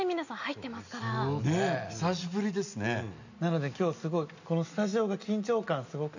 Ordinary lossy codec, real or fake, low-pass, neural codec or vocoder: none; real; 7.2 kHz; none